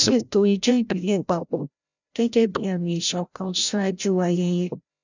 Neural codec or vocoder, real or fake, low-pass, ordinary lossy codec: codec, 16 kHz, 0.5 kbps, FreqCodec, larger model; fake; 7.2 kHz; none